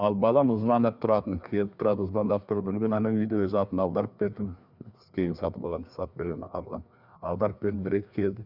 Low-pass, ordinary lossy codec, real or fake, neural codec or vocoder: 5.4 kHz; Opus, 64 kbps; fake; codec, 16 kHz, 2 kbps, FreqCodec, larger model